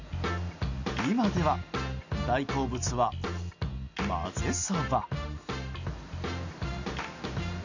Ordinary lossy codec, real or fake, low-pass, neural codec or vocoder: none; real; 7.2 kHz; none